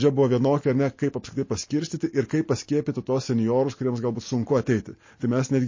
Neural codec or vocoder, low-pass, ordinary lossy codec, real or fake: none; 7.2 kHz; MP3, 32 kbps; real